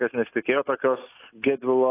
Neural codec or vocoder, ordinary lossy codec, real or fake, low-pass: none; AAC, 24 kbps; real; 3.6 kHz